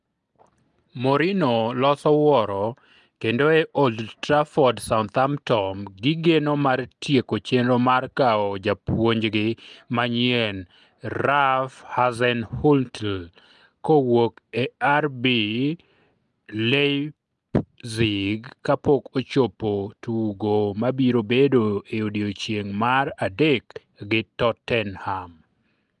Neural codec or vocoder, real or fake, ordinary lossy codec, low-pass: none; real; Opus, 32 kbps; 10.8 kHz